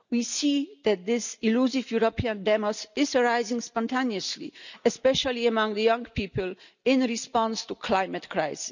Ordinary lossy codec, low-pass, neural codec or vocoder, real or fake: none; 7.2 kHz; none; real